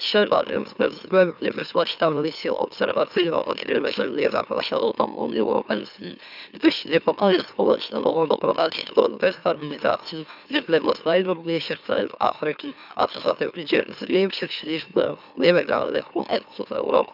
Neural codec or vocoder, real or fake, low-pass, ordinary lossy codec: autoencoder, 44.1 kHz, a latent of 192 numbers a frame, MeloTTS; fake; 5.4 kHz; none